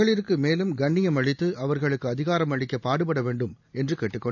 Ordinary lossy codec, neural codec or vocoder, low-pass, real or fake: none; none; 7.2 kHz; real